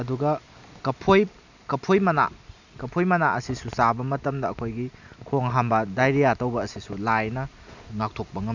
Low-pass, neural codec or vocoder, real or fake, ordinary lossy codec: 7.2 kHz; none; real; none